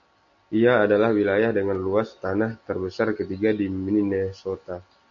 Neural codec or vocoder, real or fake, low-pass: none; real; 7.2 kHz